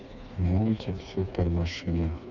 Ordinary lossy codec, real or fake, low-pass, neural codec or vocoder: none; fake; 7.2 kHz; codec, 16 kHz, 2 kbps, FreqCodec, smaller model